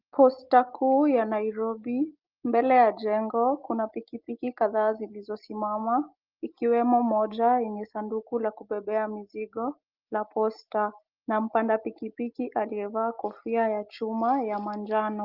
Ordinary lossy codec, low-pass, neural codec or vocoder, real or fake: Opus, 32 kbps; 5.4 kHz; none; real